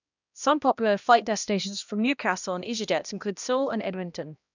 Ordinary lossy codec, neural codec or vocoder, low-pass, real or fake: none; codec, 16 kHz, 1 kbps, X-Codec, HuBERT features, trained on balanced general audio; 7.2 kHz; fake